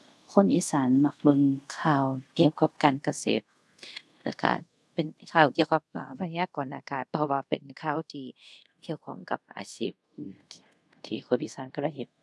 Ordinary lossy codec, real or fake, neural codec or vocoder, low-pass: none; fake; codec, 24 kHz, 0.5 kbps, DualCodec; none